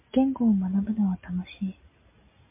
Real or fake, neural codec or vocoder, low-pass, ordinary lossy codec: real; none; 3.6 kHz; MP3, 24 kbps